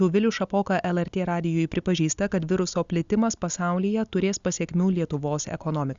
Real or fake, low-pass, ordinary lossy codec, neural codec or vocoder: real; 7.2 kHz; Opus, 64 kbps; none